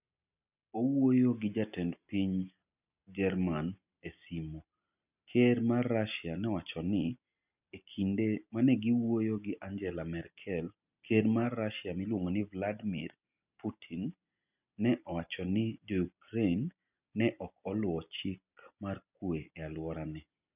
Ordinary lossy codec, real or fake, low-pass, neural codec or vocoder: none; real; 3.6 kHz; none